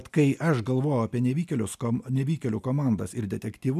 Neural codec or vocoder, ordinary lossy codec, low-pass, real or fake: none; MP3, 96 kbps; 14.4 kHz; real